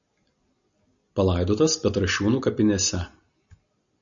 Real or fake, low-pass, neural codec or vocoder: real; 7.2 kHz; none